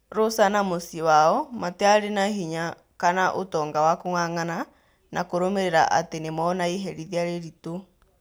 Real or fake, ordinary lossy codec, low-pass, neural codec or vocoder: real; none; none; none